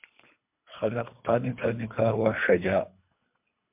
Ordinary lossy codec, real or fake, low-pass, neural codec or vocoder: MP3, 32 kbps; fake; 3.6 kHz; codec, 24 kHz, 3 kbps, HILCodec